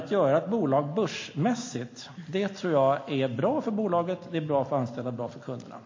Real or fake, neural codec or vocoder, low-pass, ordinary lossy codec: real; none; 7.2 kHz; MP3, 32 kbps